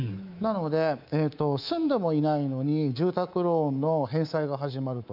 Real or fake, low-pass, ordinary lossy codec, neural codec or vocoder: fake; 5.4 kHz; none; codec, 24 kHz, 3.1 kbps, DualCodec